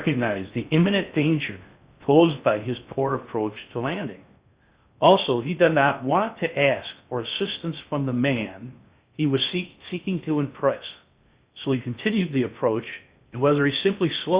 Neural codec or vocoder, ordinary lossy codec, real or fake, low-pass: codec, 16 kHz in and 24 kHz out, 0.6 kbps, FocalCodec, streaming, 4096 codes; Opus, 64 kbps; fake; 3.6 kHz